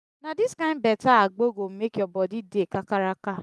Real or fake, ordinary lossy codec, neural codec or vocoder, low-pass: real; none; none; none